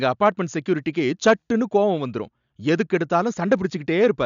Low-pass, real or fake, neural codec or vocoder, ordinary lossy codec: 7.2 kHz; real; none; none